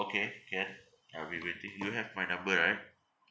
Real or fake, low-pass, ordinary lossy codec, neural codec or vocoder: real; none; none; none